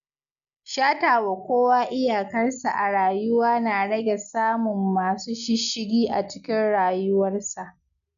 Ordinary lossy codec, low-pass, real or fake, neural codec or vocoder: none; 7.2 kHz; real; none